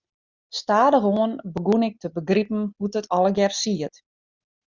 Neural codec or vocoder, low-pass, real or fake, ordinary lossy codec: none; 7.2 kHz; real; Opus, 64 kbps